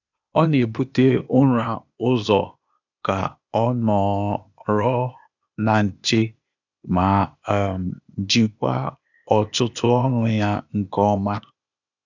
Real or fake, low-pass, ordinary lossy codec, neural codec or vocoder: fake; 7.2 kHz; none; codec, 16 kHz, 0.8 kbps, ZipCodec